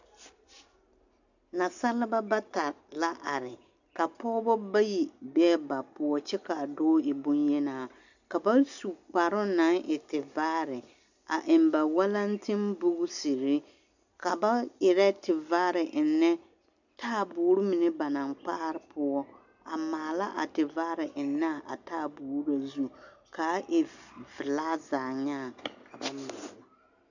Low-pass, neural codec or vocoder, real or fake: 7.2 kHz; none; real